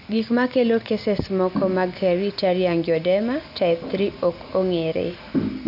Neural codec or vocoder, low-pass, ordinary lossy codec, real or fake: none; 5.4 kHz; none; real